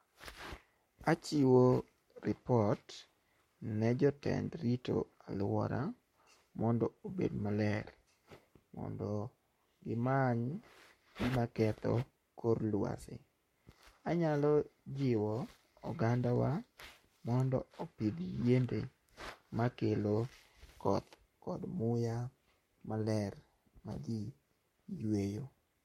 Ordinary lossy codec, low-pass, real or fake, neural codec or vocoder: MP3, 64 kbps; 19.8 kHz; fake; codec, 44.1 kHz, 7.8 kbps, Pupu-Codec